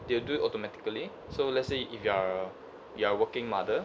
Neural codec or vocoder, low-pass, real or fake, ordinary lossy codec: none; none; real; none